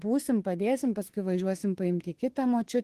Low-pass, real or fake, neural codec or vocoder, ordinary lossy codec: 14.4 kHz; fake; autoencoder, 48 kHz, 32 numbers a frame, DAC-VAE, trained on Japanese speech; Opus, 16 kbps